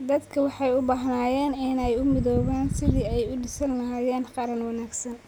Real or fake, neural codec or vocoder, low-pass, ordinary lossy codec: real; none; none; none